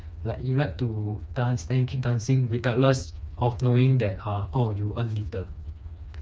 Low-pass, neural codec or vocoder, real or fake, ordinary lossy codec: none; codec, 16 kHz, 2 kbps, FreqCodec, smaller model; fake; none